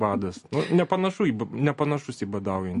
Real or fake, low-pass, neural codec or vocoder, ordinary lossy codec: real; 10.8 kHz; none; MP3, 48 kbps